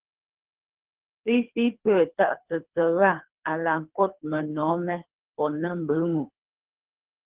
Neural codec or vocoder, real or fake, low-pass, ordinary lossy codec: codec, 24 kHz, 3 kbps, HILCodec; fake; 3.6 kHz; Opus, 16 kbps